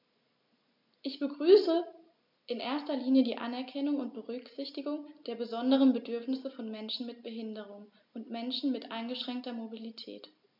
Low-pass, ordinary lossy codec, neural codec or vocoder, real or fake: 5.4 kHz; MP3, 48 kbps; none; real